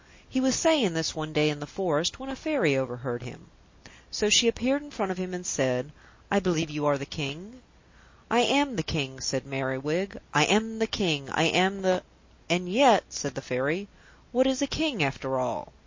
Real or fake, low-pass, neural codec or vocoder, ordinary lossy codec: real; 7.2 kHz; none; MP3, 32 kbps